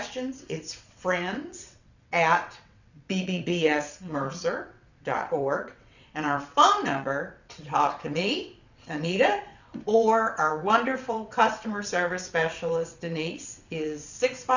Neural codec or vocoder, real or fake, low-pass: vocoder, 22.05 kHz, 80 mel bands, WaveNeXt; fake; 7.2 kHz